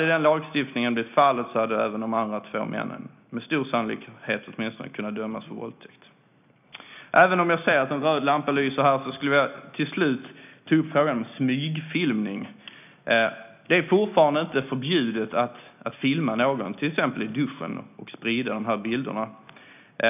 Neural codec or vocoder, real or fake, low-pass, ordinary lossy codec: none; real; 3.6 kHz; none